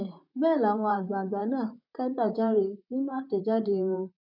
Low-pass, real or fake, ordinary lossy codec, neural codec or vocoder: 5.4 kHz; fake; none; vocoder, 44.1 kHz, 128 mel bands, Pupu-Vocoder